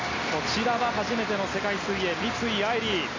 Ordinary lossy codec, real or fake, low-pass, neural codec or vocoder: AAC, 32 kbps; real; 7.2 kHz; none